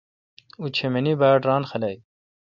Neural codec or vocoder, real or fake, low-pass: none; real; 7.2 kHz